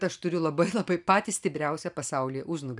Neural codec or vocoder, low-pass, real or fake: none; 10.8 kHz; real